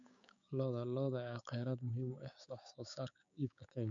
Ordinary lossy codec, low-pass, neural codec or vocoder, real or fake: MP3, 64 kbps; 7.2 kHz; codec, 16 kHz, 6 kbps, DAC; fake